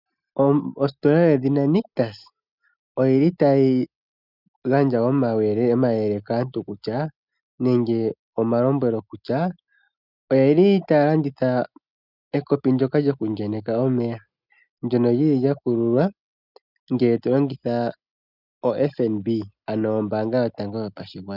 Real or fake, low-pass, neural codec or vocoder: real; 5.4 kHz; none